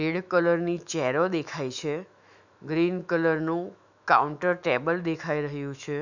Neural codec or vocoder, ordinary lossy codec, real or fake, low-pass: autoencoder, 48 kHz, 128 numbers a frame, DAC-VAE, trained on Japanese speech; none; fake; 7.2 kHz